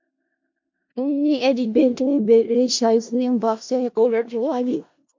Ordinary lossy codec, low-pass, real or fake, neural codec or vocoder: MP3, 48 kbps; 7.2 kHz; fake; codec, 16 kHz in and 24 kHz out, 0.4 kbps, LongCat-Audio-Codec, four codebook decoder